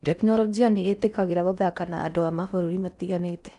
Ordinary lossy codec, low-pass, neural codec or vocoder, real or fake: none; 10.8 kHz; codec, 16 kHz in and 24 kHz out, 0.6 kbps, FocalCodec, streaming, 4096 codes; fake